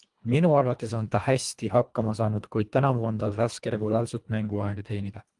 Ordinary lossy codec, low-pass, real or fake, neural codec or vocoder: Opus, 32 kbps; 10.8 kHz; fake; codec, 24 kHz, 1.5 kbps, HILCodec